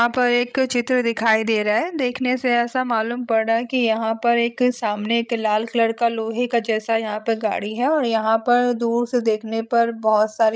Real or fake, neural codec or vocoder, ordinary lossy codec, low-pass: fake; codec, 16 kHz, 16 kbps, FreqCodec, larger model; none; none